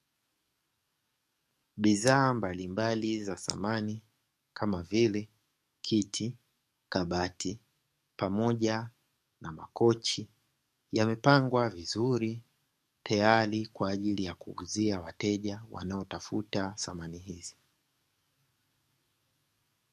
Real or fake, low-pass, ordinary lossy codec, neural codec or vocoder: fake; 14.4 kHz; MP3, 64 kbps; codec, 44.1 kHz, 7.8 kbps, DAC